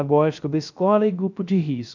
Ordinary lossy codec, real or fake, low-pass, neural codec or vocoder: none; fake; 7.2 kHz; codec, 16 kHz, 0.3 kbps, FocalCodec